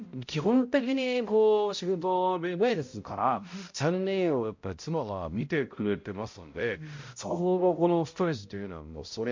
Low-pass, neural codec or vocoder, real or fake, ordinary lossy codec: 7.2 kHz; codec, 16 kHz, 0.5 kbps, X-Codec, HuBERT features, trained on balanced general audio; fake; MP3, 48 kbps